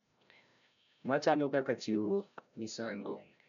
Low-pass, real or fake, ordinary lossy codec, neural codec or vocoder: 7.2 kHz; fake; none; codec, 16 kHz, 0.5 kbps, FreqCodec, larger model